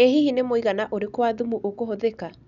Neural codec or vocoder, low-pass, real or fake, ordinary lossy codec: none; 7.2 kHz; real; none